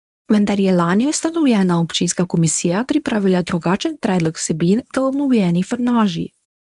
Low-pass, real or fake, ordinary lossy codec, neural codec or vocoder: 10.8 kHz; fake; none; codec, 24 kHz, 0.9 kbps, WavTokenizer, medium speech release version 1